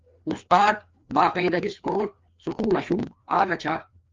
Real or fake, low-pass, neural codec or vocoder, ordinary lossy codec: fake; 7.2 kHz; codec, 16 kHz, 2 kbps, FreqCodec, larger model; Opus, 32 kbps